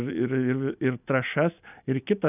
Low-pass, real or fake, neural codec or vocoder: 3.6 kHz; real; none